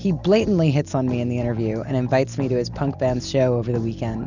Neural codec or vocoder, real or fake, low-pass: none; real; 7.2 kHz